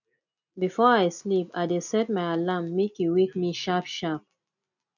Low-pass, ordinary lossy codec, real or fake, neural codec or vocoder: 7.2 kHz; none; real; none